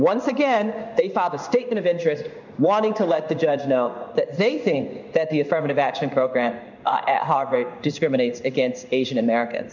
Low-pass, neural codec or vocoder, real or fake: 7.2 kHz; codec, 16 kHz in and 24 kHz out, 1 kbps, XY-Tokenizer; fake